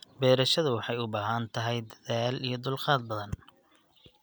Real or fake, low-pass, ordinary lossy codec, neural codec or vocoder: real; none; none; none